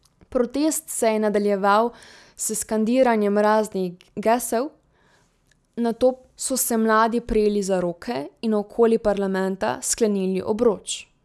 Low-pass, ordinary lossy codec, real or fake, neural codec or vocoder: none; none; real; none